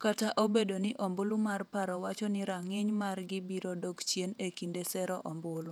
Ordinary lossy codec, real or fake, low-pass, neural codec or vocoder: none; fake; 19.8 kHz; vocoder, 48 kHz, 128 mel bands, Vocos